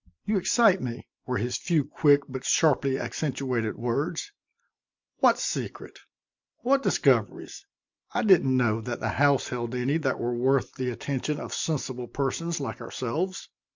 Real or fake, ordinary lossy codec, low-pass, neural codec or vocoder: real; MP3, 64 kbps; 7.2 kHz; none